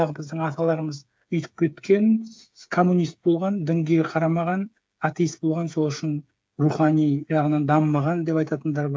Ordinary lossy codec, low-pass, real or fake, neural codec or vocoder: none; none; fake; codec, 16 kHz, 8 kbps, FreqCodec, smaller model